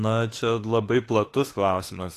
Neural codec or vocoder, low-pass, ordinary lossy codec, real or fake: autoencoder, 48 kHz, 32 numbers a frame, DAC-VAE, trained on Japanese speech; 14.4 kHz; AAC, 48 kbps; fake